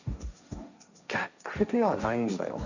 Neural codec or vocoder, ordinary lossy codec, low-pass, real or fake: codec, 16 kHz, 1.1 kbps, Voila-Tokenizer; none; 7.2 kHz; fake